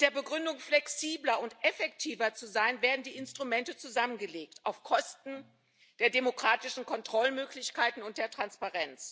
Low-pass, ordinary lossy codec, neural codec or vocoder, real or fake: none; none; none; real